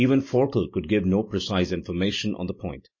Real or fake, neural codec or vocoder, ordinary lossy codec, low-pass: real; none; MP3, 32 kbps; 7.2 kHz